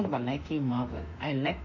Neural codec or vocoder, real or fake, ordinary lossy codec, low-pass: autoencoder, 48 kHz, 32 numbers a frame, DAC-VAE, trained on Japanese speech; fake; none; 7.2 kHz